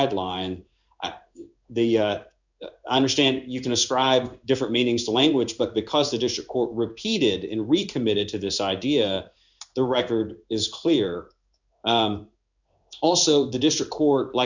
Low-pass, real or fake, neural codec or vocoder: 7.2 kHz; fake; codec, 16 kHz in and 24 kHz out, 1 kbps, XY-Tokenizer